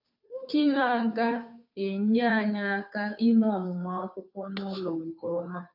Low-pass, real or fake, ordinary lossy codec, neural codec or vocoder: 5.4 kHz; fake; AAC, 48 kbps; codec, 16 kHz, 2 kbps, FunCodec, trained on Chinese and English, 25 frames a second